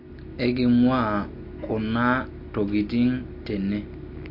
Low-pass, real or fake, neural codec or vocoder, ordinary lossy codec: 5.4 kHz; real; none; MP3, 32 kbps